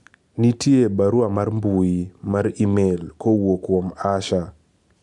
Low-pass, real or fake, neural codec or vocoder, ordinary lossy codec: 10.8 kHz; real; none; MP3, 96 kbps